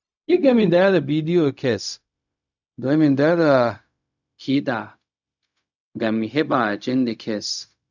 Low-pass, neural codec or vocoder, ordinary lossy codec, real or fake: 7.2 kHz; codec, 16 kHz, 0.4 kbps, LongCat-Audio-Codec; none; fake